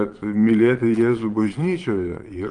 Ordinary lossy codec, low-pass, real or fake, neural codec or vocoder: Opus, 24 kbps; 9.9 kHz; fake; vocoder, 22.05 kHz, 80 mel bands, Vocos